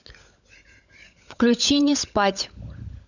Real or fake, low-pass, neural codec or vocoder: fake; 7.2 kHz; codec, 16 kHz, 16 kbps, FunCodec, trained on LibriTTS, 50 frames a second